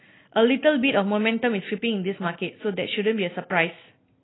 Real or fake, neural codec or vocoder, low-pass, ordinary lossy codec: real; none; 7.2 kHz; AAC, 16 kbps